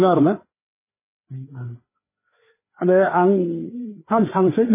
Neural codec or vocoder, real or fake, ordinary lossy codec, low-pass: codec, 16 kHz, 4 kbps, FreqCodec, larger model; fake; MP3, 16 kbps; 3.6 kHz